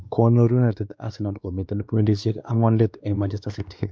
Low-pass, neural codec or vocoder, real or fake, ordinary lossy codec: none; codec, 16 kHz, 2 kbps, X-Codec, WavLM features, trained on Multilingual LibriSpeech; fake; none